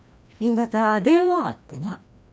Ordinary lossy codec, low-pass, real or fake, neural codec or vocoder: none; none; fake; codec, 16 kHz, 1 kbps, FreqCodec, larger model